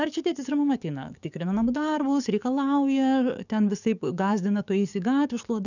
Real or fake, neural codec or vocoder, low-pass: fake; codec, 44.1 kHz, 7.8 kbps, DAC; 7.2 kHz